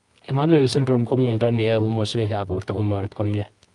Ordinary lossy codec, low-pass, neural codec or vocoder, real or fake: Opus, 32 kbps; 10.8 kHz; codec, 24 kHz, 0.9 kbps, WavTokenizer, medium music audio release; fake